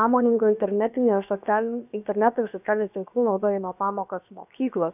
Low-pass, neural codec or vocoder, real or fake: 3.6 kHz; codec, 16 kHz, about 1 kbps, DyCAST, with the encoder's durations; fake